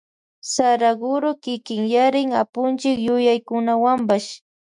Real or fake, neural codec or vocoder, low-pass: fake; autoencoder, 48 kHz, 128 numbers a frame, DAC-VAE, trained on Japanese speech; 10.8 kHz